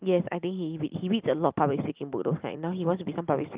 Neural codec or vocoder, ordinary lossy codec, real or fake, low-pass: none; Opus, 32 kbps; real; 3.6 kHz